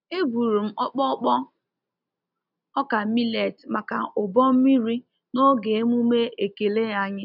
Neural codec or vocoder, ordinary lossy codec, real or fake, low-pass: none; none; real; 5.4 kHz